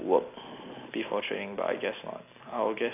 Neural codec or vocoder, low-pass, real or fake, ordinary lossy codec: none; 3.6 kHz; real; AAC, 24 kbps